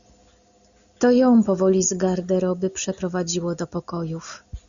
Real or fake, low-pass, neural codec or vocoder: real; 7.2 kHz; none